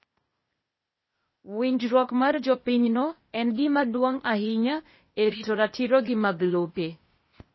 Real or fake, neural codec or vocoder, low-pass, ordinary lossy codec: fake; codec, 16 kHz, 0.8 kbps, ZipCodec; 7.2 kHz; MP3, 24 kbps